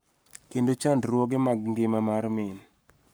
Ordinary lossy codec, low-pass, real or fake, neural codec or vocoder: none; none; fake; codec, 44.1 kHz, 7.8 kbps, Pupu-Codec